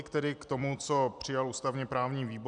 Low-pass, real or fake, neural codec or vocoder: 9.9 kHz; real; none